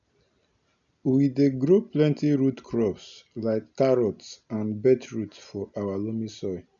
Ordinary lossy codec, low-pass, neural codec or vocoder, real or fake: MP3, 96 kbps; 7.2 kHz; none; real